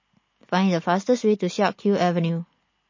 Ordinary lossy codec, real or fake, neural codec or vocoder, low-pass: MP3, 32 kbps; real; none; 7.2 kHz